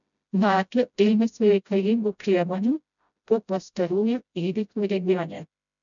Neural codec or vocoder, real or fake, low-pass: codec, 16 kHz, 0.5 kbps, FreqCodec, smaller model; fake; 7.2 kHz